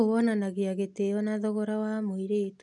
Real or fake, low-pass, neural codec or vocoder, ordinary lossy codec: real; 10.8 kHz; none; none